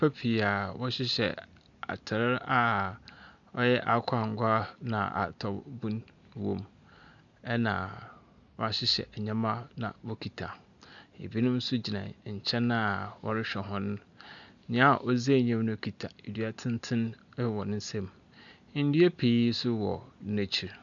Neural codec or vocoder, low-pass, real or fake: none; 7.2 kHz; real